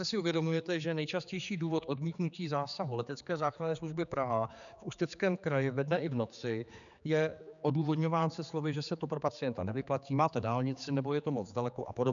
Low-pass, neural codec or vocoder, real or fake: 7.2 kHz; codec, 16 kHz, 4 kbps, X-Codec, HuBERT features, trained on general audio; fake